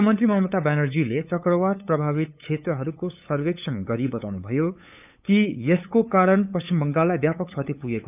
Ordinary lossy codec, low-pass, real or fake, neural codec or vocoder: none; 3.6 kHz; fake; codec, 16 kHz, 8 kbps, FunCodec, trained on LibriTTS, 25 frames a second